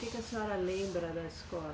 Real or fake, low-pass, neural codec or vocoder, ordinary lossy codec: real; none; none; none